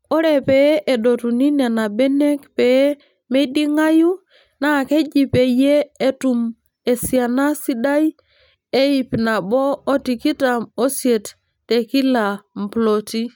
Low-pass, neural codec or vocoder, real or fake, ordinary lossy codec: 19.8 kHz; none; real; none